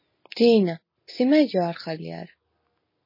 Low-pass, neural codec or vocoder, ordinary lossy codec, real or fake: 5.4 kHz; none; MP3, 24 kbps; real